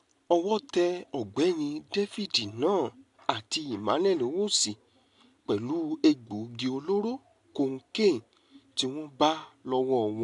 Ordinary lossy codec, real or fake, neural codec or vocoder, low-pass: AAC, 64 kbps; real; none; 10.8 kHz